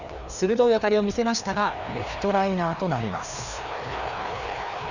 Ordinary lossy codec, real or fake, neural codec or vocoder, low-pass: none; fake; codec, 16 kHz, 2 kbps, FreqCodec, larger model; 7.2 kHz